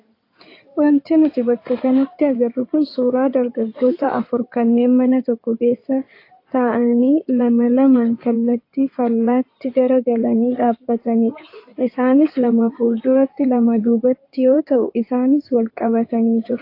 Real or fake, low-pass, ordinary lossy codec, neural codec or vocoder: fake; 5.4 kHz; AAC, 32 kbps; codec, 16 kHz in and 24 kHz out, 2.2 kbps, FireRedTTS-2 codec